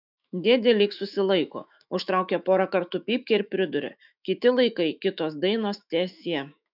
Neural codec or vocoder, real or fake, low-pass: autoencoder, 48 kHz, 128 numbers a frame, DAC-VAE, trained on Japanese speech; fake; 5.4 kHz